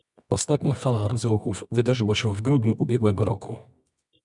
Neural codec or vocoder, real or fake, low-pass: codec, 24 kHz, 0.9 kbps, WavTokenizer, medium music audio release; fake; 10.8 kHz